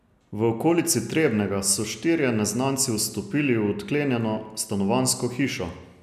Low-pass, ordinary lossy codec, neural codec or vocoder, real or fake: 14.4 kHz; none; none; real